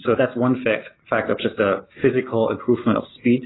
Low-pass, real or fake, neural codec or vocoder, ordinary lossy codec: 7.2 kHz; real; none; AAC, 16 kbps